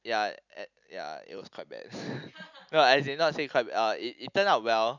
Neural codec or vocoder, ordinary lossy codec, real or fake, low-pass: none; none; real; 7.2 kHz